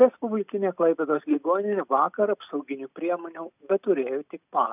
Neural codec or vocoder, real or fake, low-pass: none; real; 3.6 kHz